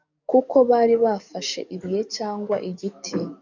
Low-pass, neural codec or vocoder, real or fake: 7.2 kHz; codec, 44.1 kHz, 7.8 kbps, DAC; fake